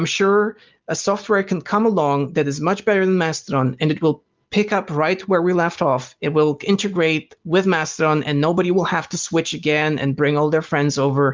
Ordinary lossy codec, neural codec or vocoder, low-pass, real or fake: Opus, 32 kbps; none; 7.2 kHz; real